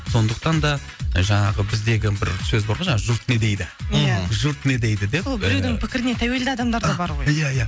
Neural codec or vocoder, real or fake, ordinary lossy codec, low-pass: none; real; none; none